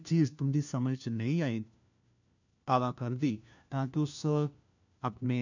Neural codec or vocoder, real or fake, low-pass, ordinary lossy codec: codec, 16 kHz, 1 kbps, FunCodec, trained on LibriTTS, 50 frames a second; fake; 7.2 kHz; none